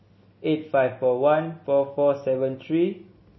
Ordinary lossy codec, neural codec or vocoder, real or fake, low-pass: MP3, 24 kbps; none; real; 7.2 kHz